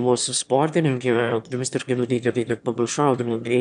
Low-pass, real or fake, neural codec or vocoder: 9.9 kHz; fake; autoencoder, 22.05 kHz, a latent of 192 numbers a frame, VITS, trained on one speaker